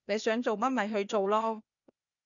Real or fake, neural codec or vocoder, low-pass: fake; codec, 16 kHz, 0.8 kbps, ZipCodec; 7.2 kHz